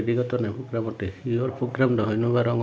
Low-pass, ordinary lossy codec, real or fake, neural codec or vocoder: none; none; real; none